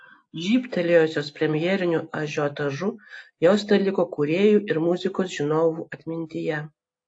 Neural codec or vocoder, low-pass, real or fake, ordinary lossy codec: none; 9.9 kHz; real; AAC, 48 kbps